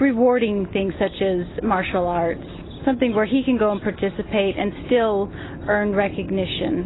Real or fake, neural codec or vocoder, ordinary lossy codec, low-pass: real; none; AAC, 16 kbps; 7.2 kHz